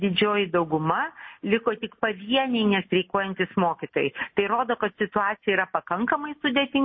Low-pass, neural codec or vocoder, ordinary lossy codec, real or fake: 7.2 kHz; none; MP3, 24 kbps; real